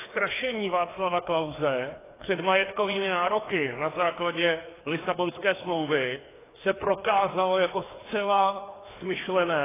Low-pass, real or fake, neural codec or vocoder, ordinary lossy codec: 3.6 kHz; fake; codec, 16 kHz, 2 kbps, FreqCodec, larger model; AAC, 16 kbps